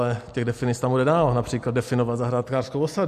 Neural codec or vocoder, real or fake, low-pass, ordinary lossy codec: none; real; 14.4 kHz; MP3, 64 kbps